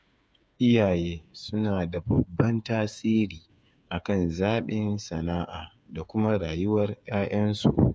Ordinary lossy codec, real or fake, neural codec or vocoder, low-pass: none; fake; codec, 16 kHz, 8 kbps, FreqCodec, smaller model; none